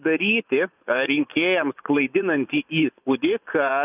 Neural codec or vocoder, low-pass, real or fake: vocoder, 24 kHz, 100 mel bands, Vocos; 3.6 kHz; fake